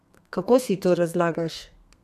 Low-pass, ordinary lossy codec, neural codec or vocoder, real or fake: 14.4 kHz; none; codec, 32 kHz, 1.9 kbps, SNAC; fake